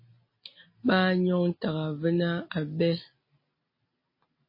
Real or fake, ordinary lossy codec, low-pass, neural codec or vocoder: real; MP3, 24 kbps; 5.4 kHz; none